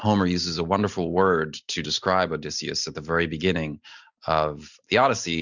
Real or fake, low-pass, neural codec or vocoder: real; 7.2 kHz; none